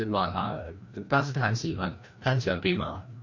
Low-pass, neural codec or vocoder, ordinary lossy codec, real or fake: 7.2 kHz; codec, 16 kHz, 1 kbps, FreqCodec, larger model; MP3, 48 kbps; fake